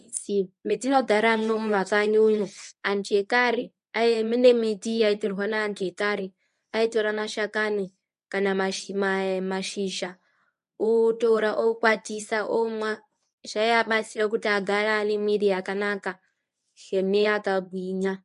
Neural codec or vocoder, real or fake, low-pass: codec, 24 kHz, 0.9 kbps, WavTokenizer, medium speech release version 1; fake; 10.8 kHz